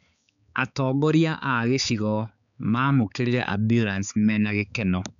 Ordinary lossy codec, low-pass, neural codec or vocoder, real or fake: none; 7.2 kHz; codec, 16 kHz, 4 kbps, X-Codec, HuBERT features, trained on balanced general audio; fake